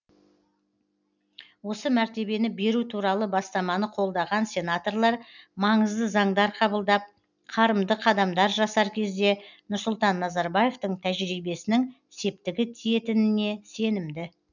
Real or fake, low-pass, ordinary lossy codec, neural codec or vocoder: real; 7.2 kHz; none; none